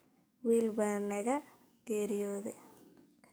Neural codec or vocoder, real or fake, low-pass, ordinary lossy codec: codec, 44.1 kHz, 7.8 kbps, DAC; fake; none; none